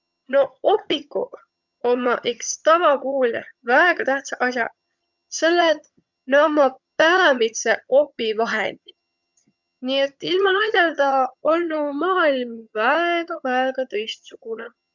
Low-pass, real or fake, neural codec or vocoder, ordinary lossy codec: 7.2 kHz; fake; vocoder, 22.05 kHz, 80 mel bands, HiFi-GAN; none